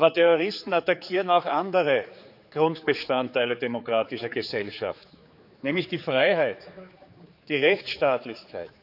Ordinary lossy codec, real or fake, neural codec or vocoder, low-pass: none; fake; codec, 16 kHz, 4 kbps, X-Codec, HuBERT features, trained on general audio; 5.4 kHz